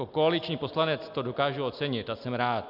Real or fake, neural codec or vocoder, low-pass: real; none; 5.4 kHz